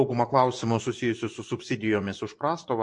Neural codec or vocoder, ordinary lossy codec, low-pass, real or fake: vocoder, 24 kHz, 100 mel bands, Vocos; MP3, 48 kbps; 10.8 kHz; fake